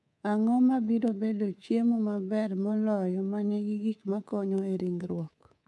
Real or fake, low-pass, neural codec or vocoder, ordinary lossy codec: fake; none; codec, 24 kHz, 3.1 kbps, DualCodec; none